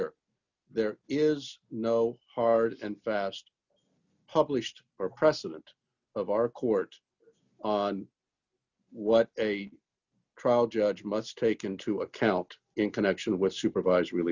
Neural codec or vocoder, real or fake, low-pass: none; real; 7.2 kHz